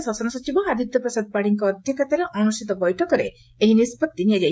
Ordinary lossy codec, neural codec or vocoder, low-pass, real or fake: none; codec, 16 kHz, 16 kbps, FreqCodec, smaller model; none; fake